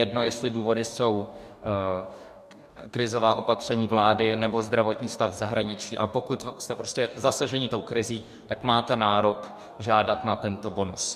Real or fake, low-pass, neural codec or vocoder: fake; 14.4 kHz; codec, 44.1 kHz, 2.6 kbps, DAC